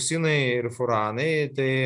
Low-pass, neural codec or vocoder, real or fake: 10.8 kHz; none; real